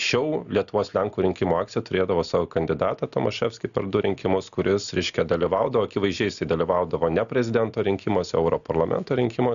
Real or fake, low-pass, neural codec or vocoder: real; 7.2 kHz; none